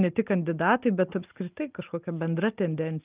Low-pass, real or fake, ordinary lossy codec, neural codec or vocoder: 3.6 kHz; real; Opus, 24 kbps; none